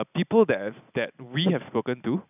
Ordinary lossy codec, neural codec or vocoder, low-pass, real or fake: none; none; 3.6 kHz; real